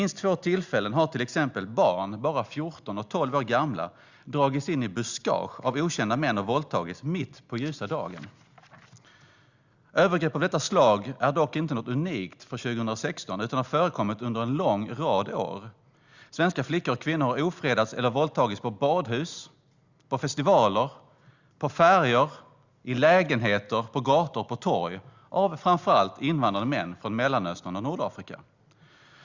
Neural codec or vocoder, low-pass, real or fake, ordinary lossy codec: none; 7.2 kHz; real; Opus, 64 kbps